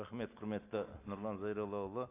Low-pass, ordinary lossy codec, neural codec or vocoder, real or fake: 3.6 kHz; AAC, 24 kbps; none; real